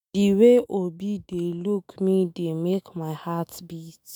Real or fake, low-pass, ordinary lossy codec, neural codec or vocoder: fake; none; none; autoencoder, 48 kHz, 128 numbers a frame, DAC-VAE, trained on Japanese speech